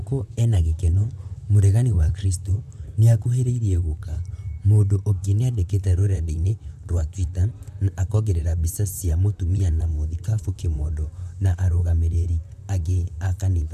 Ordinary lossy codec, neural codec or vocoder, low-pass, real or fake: none; vocoder, 44.1 kHz, 128 mel bands, Pupu-Vocoder; 14.4 kHz; fake